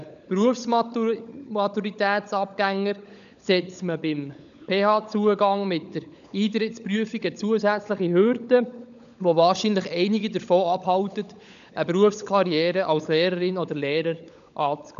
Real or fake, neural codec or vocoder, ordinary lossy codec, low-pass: fake; codec, 16 kHz, 16 kbps, FunCodec, trained on LibriTTS, 50 frames a second; none; 7.2 kHz